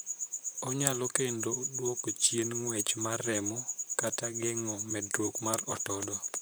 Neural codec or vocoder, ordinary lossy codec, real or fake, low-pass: vocoder, 44.1 kHz, 128 mel bands, Pupu-Vocoder; none; fake; none